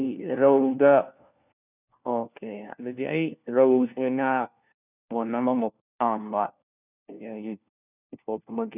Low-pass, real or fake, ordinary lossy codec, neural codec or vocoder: 3.6 kHz; fake; none; codec, 16 kHz, 1 kbps, FunCodec, trained on LibriTTS, 50 frames a second